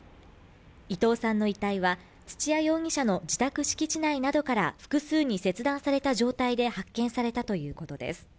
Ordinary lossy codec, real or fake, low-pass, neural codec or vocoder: none; real; none; none